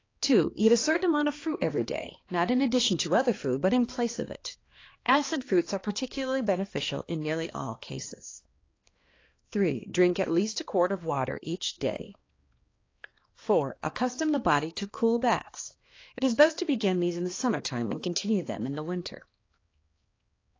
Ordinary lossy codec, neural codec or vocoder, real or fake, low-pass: AAC, 32 kbps; codec, 16 kHz, 2 kbps, X-Codec, HuBERT features, trained on balanced general audio; fake; 7.2 kHz